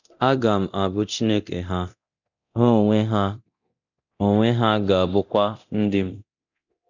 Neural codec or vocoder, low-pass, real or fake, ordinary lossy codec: codec, 24 kHz, 0.9 kbps, DualCodec; 7.2 kHz; fake; none